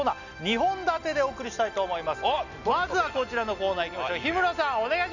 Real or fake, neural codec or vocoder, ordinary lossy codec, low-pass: real; none; none; 7.2 kHz